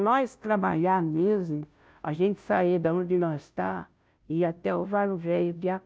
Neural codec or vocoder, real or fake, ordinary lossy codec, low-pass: codec, 16 kHz, 0.5 kbps, FunCodec, trained on Chinese and English, 25 frames a second; fake; none; none